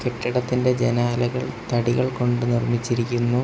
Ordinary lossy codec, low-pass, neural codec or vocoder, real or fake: none; none; none; real